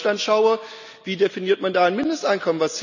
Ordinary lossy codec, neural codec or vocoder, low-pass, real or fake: none; none; 7.2 kHz; real